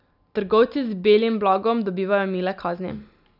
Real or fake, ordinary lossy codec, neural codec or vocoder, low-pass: real; none; none; 5.4 kHz